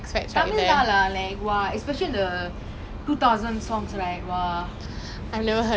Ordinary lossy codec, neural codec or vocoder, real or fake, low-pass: none; none; real; none